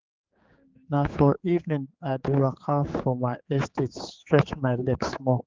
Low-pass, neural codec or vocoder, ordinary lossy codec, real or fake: 7.2 kHz; codec, 16 kHz, 4 kbps, X-Codec, HuBERT features, trained on general audio; Opus, 24 kbps; fake